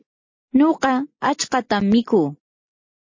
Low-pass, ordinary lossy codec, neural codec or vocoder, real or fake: 7.2 kHz; MP3, 32 kbps; none; real